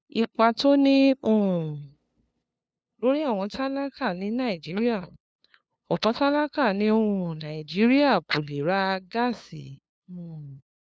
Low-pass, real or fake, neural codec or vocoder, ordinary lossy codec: none; fake; codec, 16 kHz, 2 kbps, FunCodec, trained on LibriTTS, 25 frames a second; none